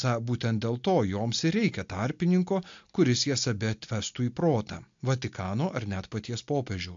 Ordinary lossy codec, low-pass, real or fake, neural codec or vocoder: AAC, 64 kbps; 7.2 kHz; real; none